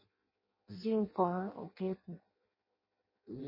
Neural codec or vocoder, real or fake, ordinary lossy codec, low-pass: codec, 16 kHz in and 24 kHz out, 0.6 kbps, FireRedTTS-2 codec; fake; MP3, 24 kbps; 5.4 kHz